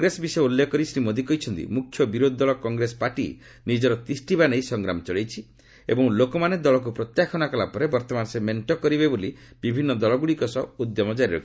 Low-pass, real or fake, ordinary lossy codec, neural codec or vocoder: none; real; none; none